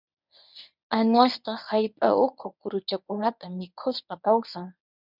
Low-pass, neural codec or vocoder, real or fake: 5.4 kHz; codec, 24 kHz, 0.9 kbps, WavTokenizer, medium speech release version 1; fake